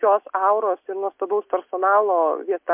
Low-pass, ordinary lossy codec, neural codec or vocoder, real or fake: 3.6 kHz; MP3, 32 kbps; none; real